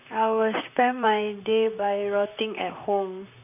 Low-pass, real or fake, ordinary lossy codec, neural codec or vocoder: 3.6 kHz; fake; none; vocoder, 44.1 kHz, 128 mel bands, Pupu-Vocoder